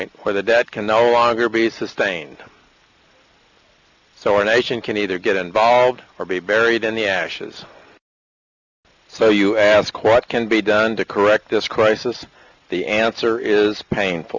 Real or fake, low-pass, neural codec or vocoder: real; 7.2 kHz; none